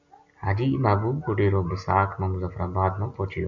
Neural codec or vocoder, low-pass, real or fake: none; 7.2 kHz; real